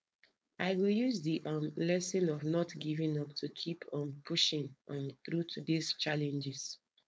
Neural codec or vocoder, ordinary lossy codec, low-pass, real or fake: codec, 16 kHz, 4.8 kbps, FACodec; none; none; fake